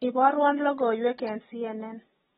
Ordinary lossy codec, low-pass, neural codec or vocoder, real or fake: AAC, 16 kbps; 10.8 kHz; none; real